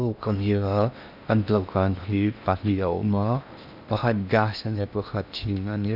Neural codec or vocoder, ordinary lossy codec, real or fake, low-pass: codec, 16 kHz in and 24 kHz out, 0.6 kbps, FocalCodec, streaming, 4096 codes; none; fake; 5.4 kHz